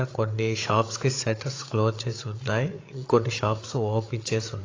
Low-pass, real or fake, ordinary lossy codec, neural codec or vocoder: 7.2 kHz; fake; AAC, 32 kbps; codec, 16 kHz, 8 kbps, FreqCodec, larger model